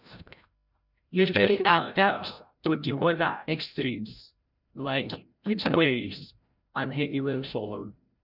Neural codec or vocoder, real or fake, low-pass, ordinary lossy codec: codec, 16 kHz, 0.5 kbps, FreqCodec, larger model; fake; 5.4 kHz; none